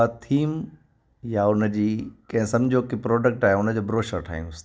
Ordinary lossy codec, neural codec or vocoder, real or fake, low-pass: none; none; real; none